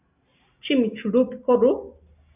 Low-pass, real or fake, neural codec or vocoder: 3.6 kHz; real; none